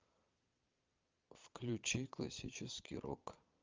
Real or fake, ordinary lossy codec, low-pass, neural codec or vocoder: fake; Opus, 24 kbps; 7.2 kHz; vocoder, 22.05 kHz, 80 mel bands, Vocos